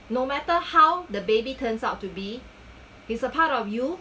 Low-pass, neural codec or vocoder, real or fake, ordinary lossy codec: none; none; real; none